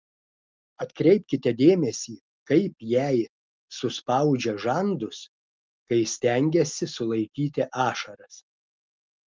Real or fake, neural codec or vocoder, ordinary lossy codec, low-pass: real; none; Opus, 32 kbps; 7.2 kHz